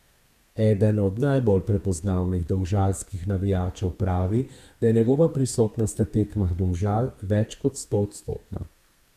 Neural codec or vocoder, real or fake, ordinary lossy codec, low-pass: codec, 32 kHz, 1.9 kbps, SNAC; fake; none; 14.4 kHz